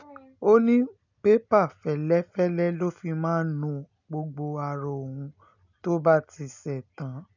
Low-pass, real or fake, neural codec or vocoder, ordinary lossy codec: 7.2 kHz; real; none; none